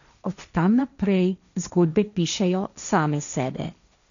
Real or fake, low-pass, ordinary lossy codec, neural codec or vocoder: fake; 7.2 kHz; none; codec, 16 kHz, 1.1 kbps, Voila-Tokenizer